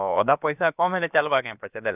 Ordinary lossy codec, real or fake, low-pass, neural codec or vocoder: none; fake; 3.6 kHz; codec, 16 kHz, about 1 kbps, DyCAST, with the encoder's durations